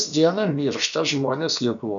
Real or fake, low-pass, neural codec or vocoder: fake; 7.2 kHz; codec, 16 kHz, about 1 kbps, DyCAST, with the encoder's durations